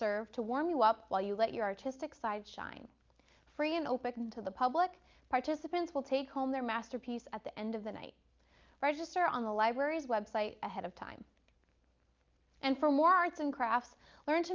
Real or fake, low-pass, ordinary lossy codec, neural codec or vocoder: real; 7.2 kHz; Opus, 24 kbps; none